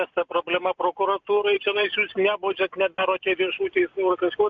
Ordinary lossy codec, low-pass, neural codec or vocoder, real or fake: MP3, 64 kbps; 7.2 kHz; none; real